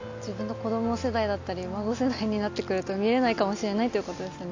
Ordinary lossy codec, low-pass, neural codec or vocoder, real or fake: AAC, 48 kbps; 7.2 kHz; none; real